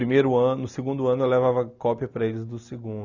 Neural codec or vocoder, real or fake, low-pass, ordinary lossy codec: none; real; 7.2 kHz; none